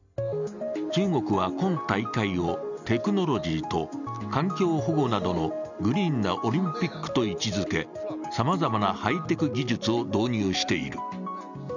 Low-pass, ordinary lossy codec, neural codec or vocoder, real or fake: 7.2 kHz; none; none; real